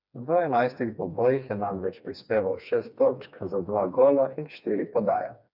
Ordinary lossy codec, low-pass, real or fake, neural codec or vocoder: none; 5.4 kHz; fake; codec, 16 kHz, 2 kbps, FreqCodec, smaller model